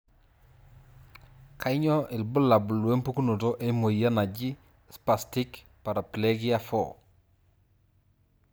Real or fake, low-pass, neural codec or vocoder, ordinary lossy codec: real; none; none; none